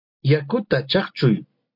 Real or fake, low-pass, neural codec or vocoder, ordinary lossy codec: real; 5.4 kHz; none; MP3, 48 kbps